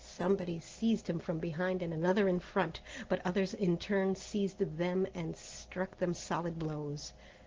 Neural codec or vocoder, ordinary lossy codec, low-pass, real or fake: none; Opus, 16 kbps; 7.2 kHz; real